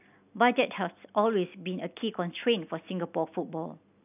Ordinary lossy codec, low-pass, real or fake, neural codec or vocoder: none; 3.6 kHz; real; none